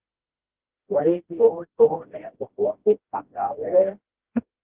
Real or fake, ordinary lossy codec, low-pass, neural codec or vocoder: fake; Opus, 16 kbps; 3.6 kHz; codec, 16 kHz, 1 kbps, FreqCodec, smaller model